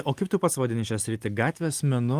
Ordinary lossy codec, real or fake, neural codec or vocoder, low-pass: Opus, 24 kbps; real; none; 14.4 kHz